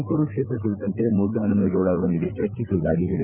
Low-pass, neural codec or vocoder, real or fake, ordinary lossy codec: 3.6 kHz; codec, 16 kHz, 4 kbps, FreqCodec, larger model; fake; none